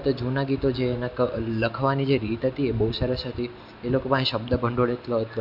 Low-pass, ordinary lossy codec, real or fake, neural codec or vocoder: 5.4 kHz; MP3, 48 kbps; real; none